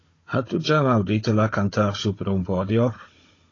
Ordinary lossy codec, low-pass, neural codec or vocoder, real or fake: AAC, 32 kbps; 7.2 kHz; codec, 16 kHz, 16 kbps, FunCodec, trained on Chinese and English, 50 frames a second; fake